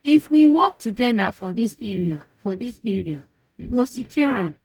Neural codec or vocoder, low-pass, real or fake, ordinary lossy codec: codec, 44.1 kHz, 0.9 kbps, DAC; 19.8 kHz; fake; none